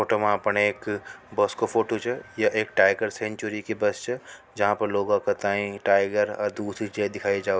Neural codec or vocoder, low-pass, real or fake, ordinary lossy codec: none; none; real; none